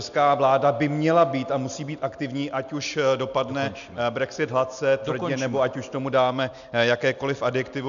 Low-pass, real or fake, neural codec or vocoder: 7.2 kHz; real; none